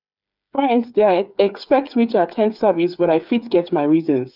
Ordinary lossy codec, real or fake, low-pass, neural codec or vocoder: none; fake; 5.4 kHz; codec, 16 kHz, 8 kbps, FreqCodec, smaller model